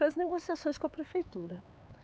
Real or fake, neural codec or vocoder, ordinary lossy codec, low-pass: fake; codec, 16 kHz, 4 kbps, X-Codec, HuBERT features, trained on LibriSpeech; none; none